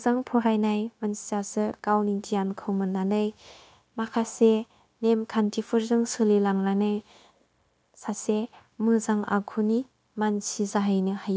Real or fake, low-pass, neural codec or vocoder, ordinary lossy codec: fake; none; codec, 16 kHz, 0.9 kbps, LongCat-Audio-Codec; none